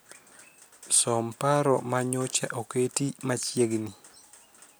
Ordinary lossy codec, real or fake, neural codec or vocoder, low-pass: none; real; none; none